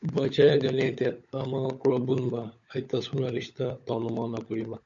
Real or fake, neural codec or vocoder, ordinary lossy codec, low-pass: fake; codec, 16 kHz, 16 kbps, FunCodec, trained on LibriTTS, 50 frames a second; MP3, 48 kbps; 7.2 kHz